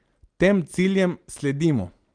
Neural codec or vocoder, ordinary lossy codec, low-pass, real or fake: none; Opus, 24 kbps; 9.9 kHz; real